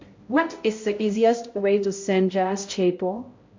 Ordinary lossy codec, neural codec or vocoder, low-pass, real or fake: MP3, 48 kbps; codec, 16 kHz, 0.5 kbps, X-Codec, HuBERT features, trained on balanced general audio; 7.2 kHz; fake